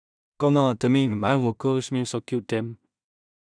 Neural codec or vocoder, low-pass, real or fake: codec, 16 kHz in and 24 kHz out, 0.4 kbps, LongCat-Audio-Codec, two codebook decoder; 9.9 kHz; fake